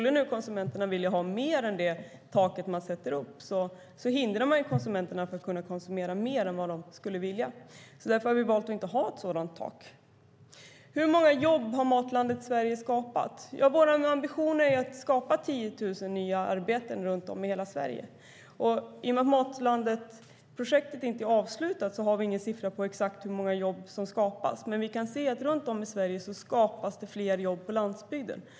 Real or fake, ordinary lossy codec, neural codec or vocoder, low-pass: real; none; none; none